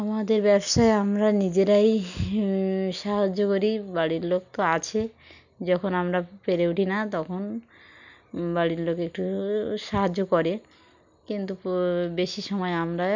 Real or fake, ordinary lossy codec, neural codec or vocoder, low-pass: real; none; none; 7.2 kHz